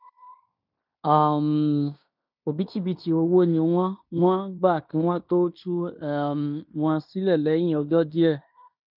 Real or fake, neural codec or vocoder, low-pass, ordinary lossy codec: fake; codec, 16 kHz in and 24 kHz out, 0.9 kbps, LongCat-Audio-Codec, fine tuned four codebook decoder; 5.4 kHz; none